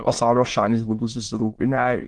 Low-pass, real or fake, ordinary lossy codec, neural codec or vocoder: 9.9 kHz; fake; Opus, 16 kbps; autoencoder, 22.05 kHz, a latent of 192 numbers a frame, VITS, trained on many speakers